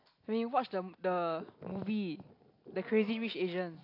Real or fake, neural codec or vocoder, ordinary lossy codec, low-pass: real; none; AAC, 32 kbps; 5.4 kHz